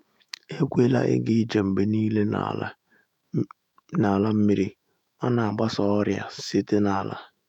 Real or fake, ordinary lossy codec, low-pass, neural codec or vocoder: fake; none; 19.8 kHz; autoencoder, 48 kHz, 128 numbers a frame, DAC-VAE, trained on Japanese speech